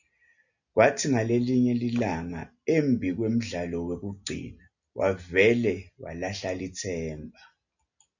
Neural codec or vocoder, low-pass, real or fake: none; 7.2 kHz; real